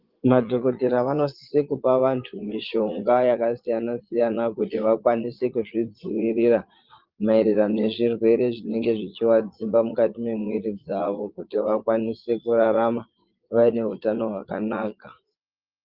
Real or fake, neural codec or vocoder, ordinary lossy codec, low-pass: fake; vocoder, 22.05 kHz, 80 mel bands, Vocos; Opus, 32 kbps; 5.4 kHz